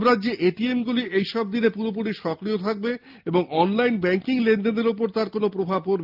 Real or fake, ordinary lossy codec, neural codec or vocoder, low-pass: real; Opus, 24 kbps; none; 5.4 kHz